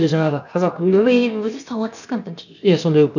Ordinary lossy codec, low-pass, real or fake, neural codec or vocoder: none; 7.2 kHz; fake; codec, 16 kHz, about 1 kbps, DyCAST, with the encoder's durations